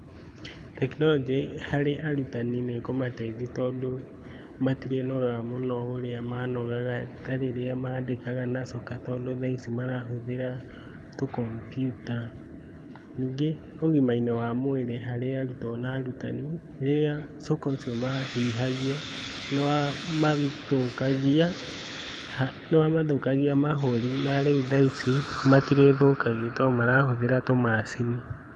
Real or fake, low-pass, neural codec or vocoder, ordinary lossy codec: fake; none; codec, 24 kHz, 6 kbps, HILCodec; none